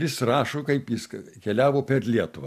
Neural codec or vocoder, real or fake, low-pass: none; real; 14.4 kHz